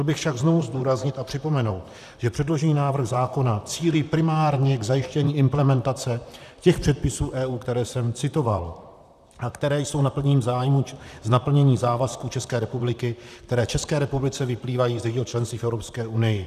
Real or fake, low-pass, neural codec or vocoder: fake; 14.4 kHz; vocoder, 44.1 kHz, 128 mel bands, Pupu-Vocoder